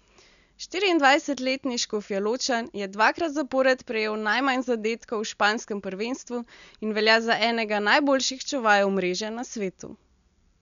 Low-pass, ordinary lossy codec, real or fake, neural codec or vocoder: 7.2 kHz; none; real; none